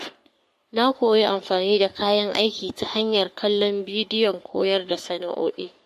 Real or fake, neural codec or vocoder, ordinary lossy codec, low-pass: fake; codec, 44.1 kHz, 3.4 kbps, Pupu-Codec; MP3, 64 kbps; 14.4 kHz